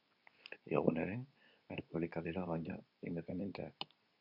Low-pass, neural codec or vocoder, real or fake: 5.4 kHz; codec, 24 kHz, 0.9 kbps, WavTokenizer, medium speech release version 2; fake